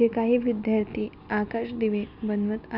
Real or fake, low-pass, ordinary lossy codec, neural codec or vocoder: real; 5.4 kHz; none; none